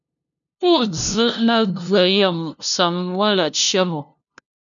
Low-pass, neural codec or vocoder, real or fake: 7.2 kHz; codec, 16 kHz, 0.5 kbps, FunCodec, trained on LibriTTS, 25 frames a second; fake